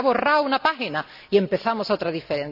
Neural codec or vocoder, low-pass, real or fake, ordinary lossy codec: none; 5.4 kHz; real; none